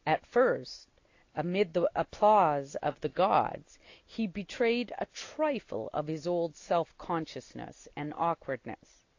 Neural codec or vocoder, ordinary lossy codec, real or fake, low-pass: none; AAC, 48 kbps; real; 7.2 kHz